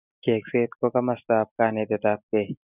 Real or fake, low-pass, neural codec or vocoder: real; 3.6 kHz; none